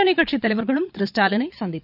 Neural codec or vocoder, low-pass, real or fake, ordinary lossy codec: vocoder, 22.05 kHz, 80 mel bands, Vocos; 5.4 kHz; fake; none